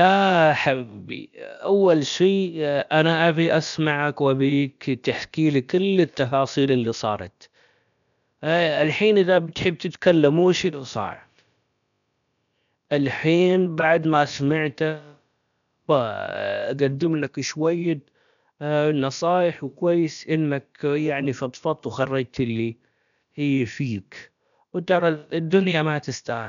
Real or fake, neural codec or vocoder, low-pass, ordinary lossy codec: fake; codec, 16 kHz, about 1 kbps, DyCAST, with the encoder's durations; 7.2 kHz; none